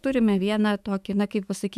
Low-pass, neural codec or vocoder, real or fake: 14.4 kHz; autoencoder, 48 kHz, 128 numbers a frame, DAC-VAE, trained on Japanese speech; fake